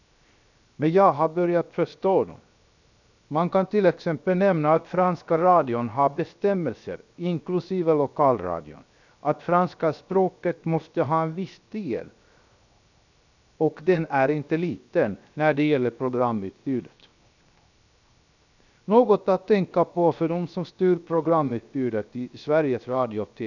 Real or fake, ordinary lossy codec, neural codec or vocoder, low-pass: fake; none; codec, 16 kHz, 0.7 kbps, FocalCodec; 7.2 kHz